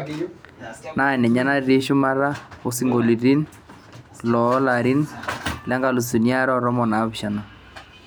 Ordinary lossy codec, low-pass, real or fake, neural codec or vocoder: none; none; real; none